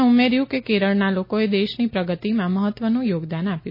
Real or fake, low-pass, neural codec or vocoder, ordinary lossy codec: real; 5.4 kHz; none; MP3, 24 kbps